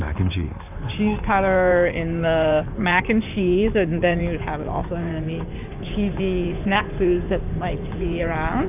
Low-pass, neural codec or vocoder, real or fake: 3.6 kHz; codec, 16 kHz in and 24 kHz out, 2.2 kbps, FireRedTTS-2 codec; fake